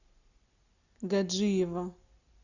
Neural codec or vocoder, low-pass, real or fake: none; 7.2 kHz; real